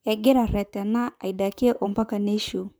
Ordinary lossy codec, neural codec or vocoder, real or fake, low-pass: none; vocoder, 44.1 kHz, 128 mel bands, Pupu-Vocoder; fake; none